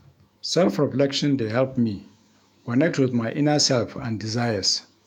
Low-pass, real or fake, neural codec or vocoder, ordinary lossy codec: 19.8 kHz; fake; autoencoder, 48 kHz, 128 numbers a frame, DAC-VAE, trained on Japanese speech; none